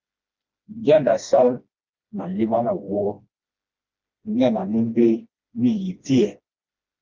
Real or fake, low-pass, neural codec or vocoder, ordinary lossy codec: fake; 7.2 kHz; codec, 16 kHz, 1 kbps, FreqCodec, smaller model; Opus, 24 kbps